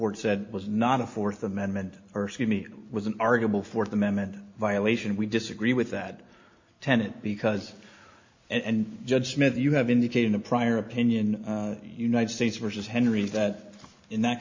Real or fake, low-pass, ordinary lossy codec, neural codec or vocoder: real; 7.2 kHz; MP3, 48 kbps; none